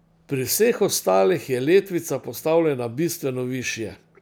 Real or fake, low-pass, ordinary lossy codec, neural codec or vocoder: real; none; none; none